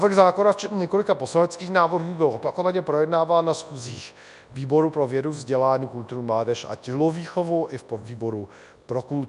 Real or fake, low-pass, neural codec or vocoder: fake; 10.8 kHz; codec, 24 kHz, 0.9 kbps, WavTokenizer, large speech release